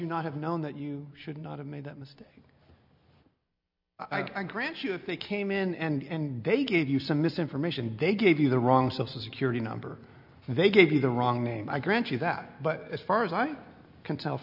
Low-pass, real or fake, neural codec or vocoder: 5.4 kHz; real; none